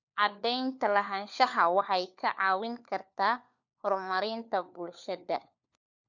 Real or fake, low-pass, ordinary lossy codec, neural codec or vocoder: fake; 7.2 kHz; none; codec, 16 kHz, 4 kbps, FunCodec, trained on LibriTTS, 50 frames a second